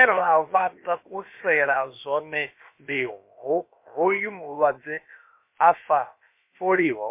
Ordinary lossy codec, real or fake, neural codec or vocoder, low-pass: MP3, 24 kbps; fake; codec, 16 kHz, about 1 kbps, DyCAST, with the encoder's durations; 3.6 kHz